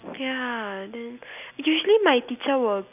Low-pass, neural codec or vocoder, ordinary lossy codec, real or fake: 3.6 kHz; none; none; real